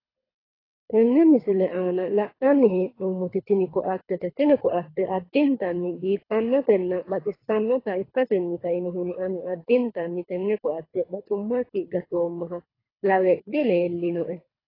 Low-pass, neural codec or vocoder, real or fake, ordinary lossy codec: 5.4 kHz; codec, 24 kHz, 3 kbps, HILCodec; fake; AAC, 24 kbps